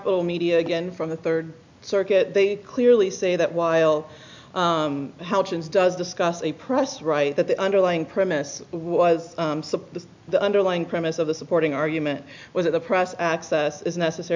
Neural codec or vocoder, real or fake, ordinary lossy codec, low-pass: none; real; MP3, 64 kbps; 7.2 kHz